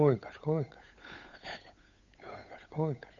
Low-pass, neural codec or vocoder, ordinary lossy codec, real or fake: 7.2 kHz; codec, 16 kHz, 8 kbps, FunCodec, trained on Chinese and English, 25 frames a second; none; fake